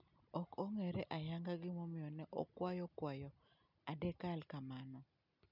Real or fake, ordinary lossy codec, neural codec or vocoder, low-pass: real; none; none; 5.4 kHz